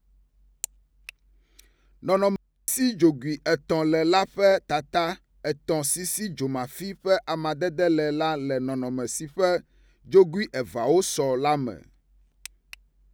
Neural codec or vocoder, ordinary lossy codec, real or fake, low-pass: none; none; real; none